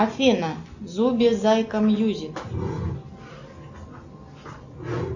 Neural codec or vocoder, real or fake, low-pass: none; real; 7.2 kHz